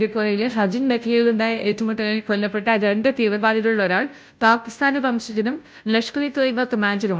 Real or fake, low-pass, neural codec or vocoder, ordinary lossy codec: fake; none; codec, 16 kHz, 0.5 kbps, FunCodec, trained on Chinese and English, 25 frames a second; none